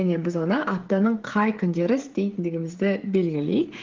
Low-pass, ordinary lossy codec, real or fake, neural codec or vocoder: 7.2 kHz; Opus, 16 kbps; fake; codec, 16 kHz, 16 kbps, FreqCodec, smaller model